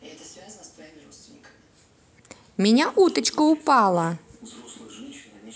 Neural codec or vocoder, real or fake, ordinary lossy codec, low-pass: none; real; none; none